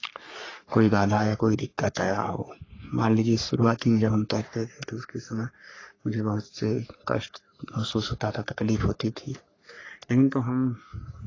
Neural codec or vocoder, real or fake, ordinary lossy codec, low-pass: codec, 44.1 kHz, 3.4 kbps, Pupu-Codec; fake; AAC, 32 kbps; 7.2 kHz